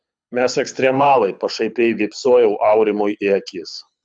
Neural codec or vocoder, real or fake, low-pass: codec, 24 kHz, 6 kbps, HILCodec; fake; 9.9 kHz